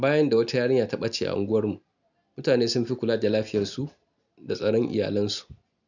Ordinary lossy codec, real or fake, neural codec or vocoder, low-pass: none; real; none; 7.2 kHz